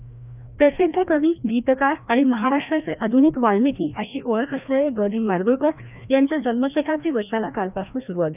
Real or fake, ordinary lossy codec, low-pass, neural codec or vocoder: fake; none; 3.6 kHz; codec, 16 kHz, 1 kbps, FreqCodec, larger model